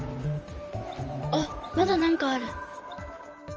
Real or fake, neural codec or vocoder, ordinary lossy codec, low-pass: fake; vocoder, 44.1 kHz, 128 mel bands, Pupu-Vocoder; Opus, 24 kbps; 7.2 kHz